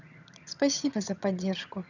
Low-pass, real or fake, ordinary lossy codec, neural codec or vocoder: 7.2 kHz; fake; none; vocoder, 22.05 kHz, 80 mel bands, HiFi-GAN